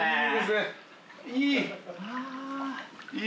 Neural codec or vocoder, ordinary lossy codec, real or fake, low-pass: none; none; real; none